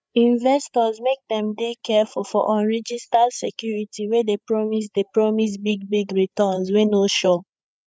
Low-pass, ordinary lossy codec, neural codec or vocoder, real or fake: none; none; codec, 16 kHz, 8 kbps, FreqCodec, larger model; fake